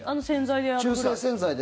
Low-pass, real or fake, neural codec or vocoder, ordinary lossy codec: none; real; none; none